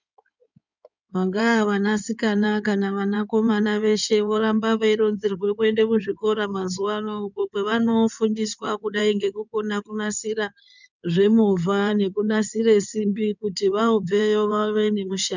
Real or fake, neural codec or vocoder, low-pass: fake; codec, 16 kHz in and 24 kHz out, 2.2 kbps, FireRedTTS-2 codec; 7.2 kHz